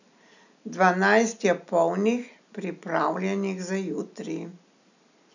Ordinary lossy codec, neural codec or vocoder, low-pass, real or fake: none; none; 7.2 kHz; real